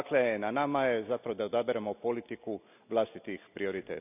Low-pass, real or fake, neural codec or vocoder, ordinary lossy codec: 3.6 kHz; real; none; none